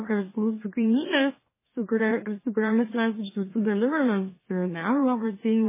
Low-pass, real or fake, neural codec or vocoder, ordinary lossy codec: 3.6 kHz; fake; autoencoder, 44.1 kHz, a latent of 192 numbers a frame, MeloTTS; MP3, 16 kbps